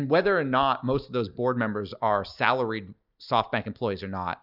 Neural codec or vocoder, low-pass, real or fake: none; 5.4 kHz; real